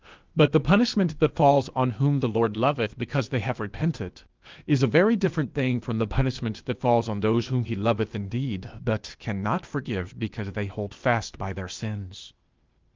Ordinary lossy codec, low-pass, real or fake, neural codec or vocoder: Opus, 24 kbps; 7.2 kHz; fake; codec, 16 kHz, 0.8 kbps, ZipCodec